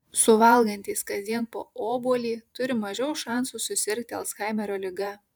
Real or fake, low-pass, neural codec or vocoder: fake; 19.8 kHz; vocoder, 44.1 kHz, 128 mel bands every 512 samples, BigVGAN v2